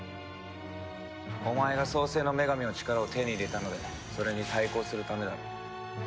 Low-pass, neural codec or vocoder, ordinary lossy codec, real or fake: none; none; none; real